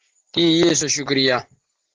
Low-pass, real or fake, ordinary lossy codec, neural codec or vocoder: 7.2 kHz; real; Opus, 16 kbps; none